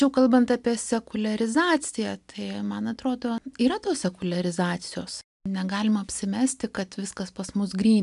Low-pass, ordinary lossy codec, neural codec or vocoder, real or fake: 10.8 kHz; MP3, 96 kbps; none; real